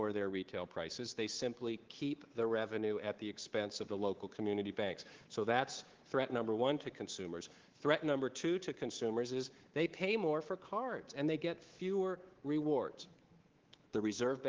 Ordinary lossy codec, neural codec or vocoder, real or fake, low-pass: Opus, 16 kbps; codec, 24 kHz, 3.1 kbps, DualCodec; fake; 7.2 kHz